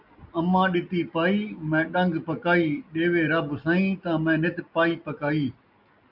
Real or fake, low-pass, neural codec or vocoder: real; 5.4 kHz; none